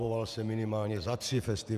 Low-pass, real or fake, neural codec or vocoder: 14.4 kHz; fake; vocoder, 48 kHz, 128 mel bands, Vocos